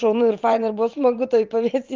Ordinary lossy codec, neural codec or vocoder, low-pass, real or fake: Opus, 24 kbps; vocoder, 44.1 kHz, 128 mel bands every 512 samples, BigVGAN v2; 7.2 kHz; fake